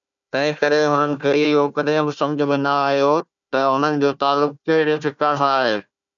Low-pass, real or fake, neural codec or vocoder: 7.2 kHz; fake; codec, 16 kHz, 1 kbps, FunCodec, trained on Chinese and English, 50 frames a second